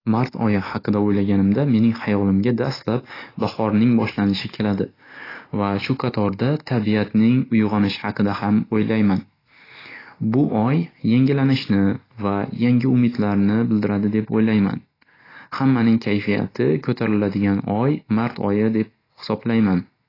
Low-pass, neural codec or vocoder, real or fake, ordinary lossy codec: 5.4 kHz; none; real; AAC, 24 kbps